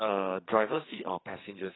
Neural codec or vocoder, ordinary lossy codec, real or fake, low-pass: codec, 44.1 kHz, 2.6 kbps, SNAC; AAC, 16 kbps; fake; 7.2 kHz